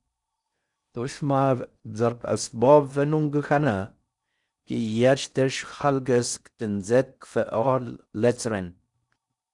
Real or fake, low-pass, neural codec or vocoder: fake; 10.8 kHz; codec, 16 kHz in and 24 kHz out, 0.6 kbps, FocalCodec, streaming, 4096 codes